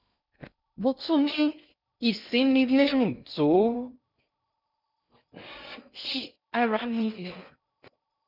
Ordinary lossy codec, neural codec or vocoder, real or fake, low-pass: none; codec, 16 kHz in and 24 kHz out, 0.6 kbps, FocalCodec, streaming, 2048 codes; fake; 5.4 kHz